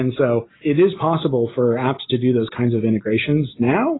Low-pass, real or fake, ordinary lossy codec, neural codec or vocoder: 7.2 kHz; real; AAC, 16 kbps; none